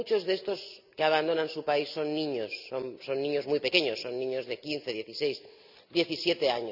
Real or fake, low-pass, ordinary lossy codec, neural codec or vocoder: real; 5.4 kHz; none; none